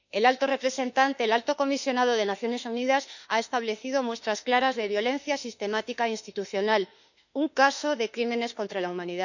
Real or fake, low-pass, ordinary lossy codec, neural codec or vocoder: fake; 7.2 kHz; none; autoencoder, 48 kHz, 32 numbers a frame, DAC-VAE, trained on Japanese speech